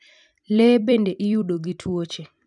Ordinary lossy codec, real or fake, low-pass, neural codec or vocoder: none; fake; 10.8 kHz; vocoder, 44.1 kHz, 128 mel bands every 256 samples, BigVGAN v2